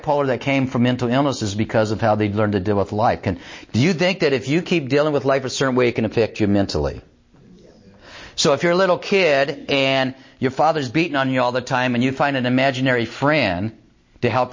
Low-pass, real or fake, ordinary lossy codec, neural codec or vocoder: 7.2 kHz; real; MP3, 32 kbps; none